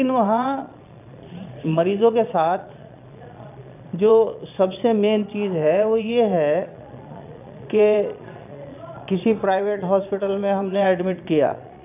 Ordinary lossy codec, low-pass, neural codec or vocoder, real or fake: none; 3.6 kHz; vocoder, 22.05 kHz, 80 mel bands, Vocos; fake